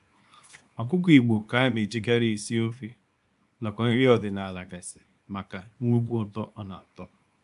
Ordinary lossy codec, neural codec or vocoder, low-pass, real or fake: AAC, 96 kbps; codec, 24 kHz, 0.9 kbps, WavTokenizer, small release; 10.8 kHz; fake